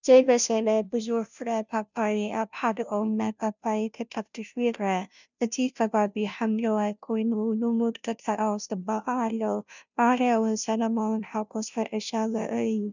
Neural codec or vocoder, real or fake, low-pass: codec, 16 kHz, 0.5 kbps, FunCodec, trained on Chinese and English, 25 frames a second; fake; 7.2 kHz